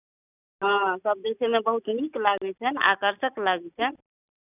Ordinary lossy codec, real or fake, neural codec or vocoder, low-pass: none; real; none; 3.6 kHz